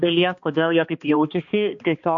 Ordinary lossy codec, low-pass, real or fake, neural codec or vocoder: MP3, 48 kbps; 7.2 kHz; fake; codec, 16 kHz, 2 kbps, X-Codec, HuBERT features, trained on balanced general audio